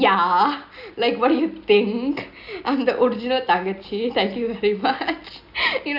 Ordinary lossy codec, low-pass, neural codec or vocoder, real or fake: none; 5.4 kHz; none; real